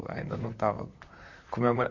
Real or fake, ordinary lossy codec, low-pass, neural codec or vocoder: fake; none; 7.2 kHz; vocoder, 44.1 kHz, 128 mel bands, Pupu-Vocoder